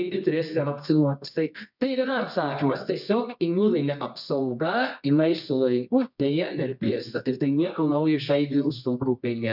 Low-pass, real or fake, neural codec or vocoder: 5.4 kHz; fake; codec, 24 kHz, 0.9 kbps, WavTokenizer, medium music audio release